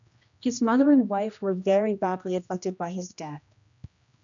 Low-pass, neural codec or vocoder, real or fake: 7.2 kHz; codec, 16 kHz, 1 kbps, X-Codec, HuBERT features, trained on general audio; fake